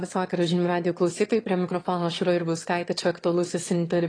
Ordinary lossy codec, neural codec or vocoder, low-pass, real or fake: AAC, 32 kbps; autoencoder, 22.05 kHz, a latent of 192 numbers a frame, VITS, trained on one speaker; 9.9 kHz; fake